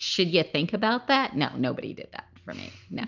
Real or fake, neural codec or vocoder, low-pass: real; none; 7.2 kHz